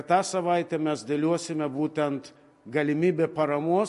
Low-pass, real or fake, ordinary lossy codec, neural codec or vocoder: 14.4 kHz; real; MP3, 48 kbps; none